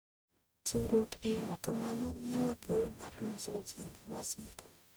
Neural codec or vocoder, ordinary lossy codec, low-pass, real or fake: codec, 44.1 kHz, 0.9 kbps, DAC; none; none; fake